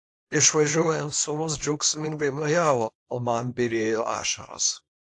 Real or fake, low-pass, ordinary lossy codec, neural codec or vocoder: fake; 10.8 kHz; AAC, 48 kbps; codec, 24 kHz, 0.9 kbps, WavTokenizer, small release